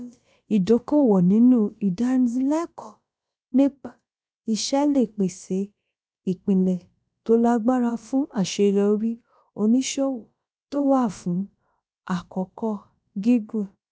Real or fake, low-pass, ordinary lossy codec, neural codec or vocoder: fake; none; none; codec, 16 kHz, about 1 kbps, DyCAST, with the encoder's durations